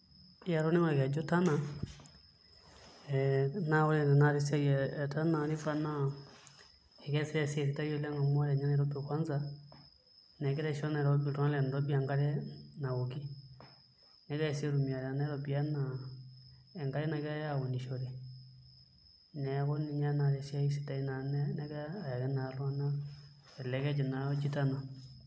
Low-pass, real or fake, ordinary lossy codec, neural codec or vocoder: none; real; none; none